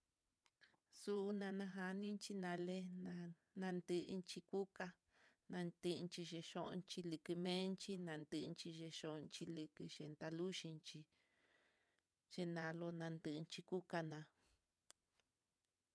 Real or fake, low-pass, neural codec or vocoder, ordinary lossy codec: fake; none; vocoder, 22.05 kHz, 80 mel bands, WaveNeXt; none